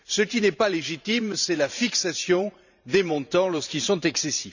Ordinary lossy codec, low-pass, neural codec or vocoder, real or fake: none; 7.2 kHz; vocoder, 44.1 kHz, 128 mel bands every 512 samples, BigVGAN v2; fake